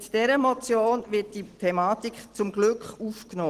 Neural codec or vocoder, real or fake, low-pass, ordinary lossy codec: none; real; 14.4 kHz; Opus, 16 kbps